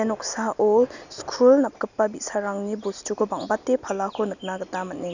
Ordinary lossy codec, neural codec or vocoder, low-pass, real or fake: none; none; 7.2 kHz; real